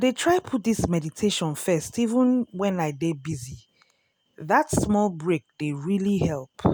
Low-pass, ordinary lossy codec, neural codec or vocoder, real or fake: none; none; none; real